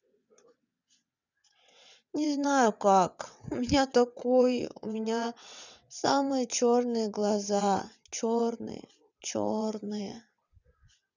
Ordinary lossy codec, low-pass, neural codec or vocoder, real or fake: none; 7.2 kHz; vocoder, 22.05 kHz, 80 mel bands, WaveNeXt; fake